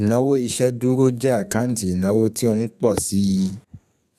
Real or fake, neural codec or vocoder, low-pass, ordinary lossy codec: fake; codec, 32 kHz, 1.9 kbps, SNAC; 14.4 kHz; none